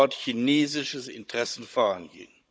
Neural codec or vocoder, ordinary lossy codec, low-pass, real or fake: codec, 16 kHz, 16 kbps, FunCodec, trained on Chinese and English, 50 frames a second; none; none; fake